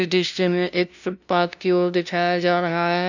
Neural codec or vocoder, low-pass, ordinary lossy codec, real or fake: codec, 16 kHz, 0.5 kbps, FunCodec, trained on LibriTTS, 25 frames a second; 7.2 kHz; none; fake